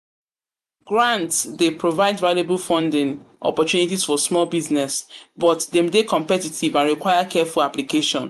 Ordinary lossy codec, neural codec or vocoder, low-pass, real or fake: MP3, 96 kbps; vocoder, 44.1 kHz, 128 mel bands every 512 samples, BigVGAN v2; 14.4 kHz; fake